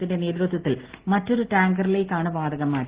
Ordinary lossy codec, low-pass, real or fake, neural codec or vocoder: Opus, 16 kbps; 3.6 kHz; real; none